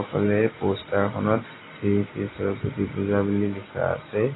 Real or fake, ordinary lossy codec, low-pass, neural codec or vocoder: real; AAC, 16 kbps; 7.2 kHz; none